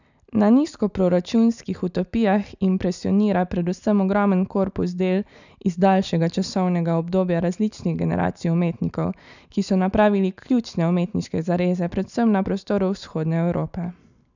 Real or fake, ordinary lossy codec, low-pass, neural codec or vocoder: real; none; 7.2 kHz; none